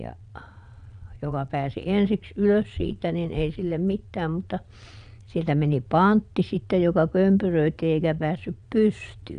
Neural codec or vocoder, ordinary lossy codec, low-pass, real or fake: vocoder, 22.05 kHz, 80 mel bands, Vocos; none; 9.9 kHz; fake